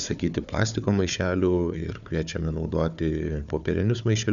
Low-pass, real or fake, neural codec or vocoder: 7.2 kHz; fake; codec, 16 kHz, 4 kbps, FunCodec, trained on Chinese and English, 50 frames a second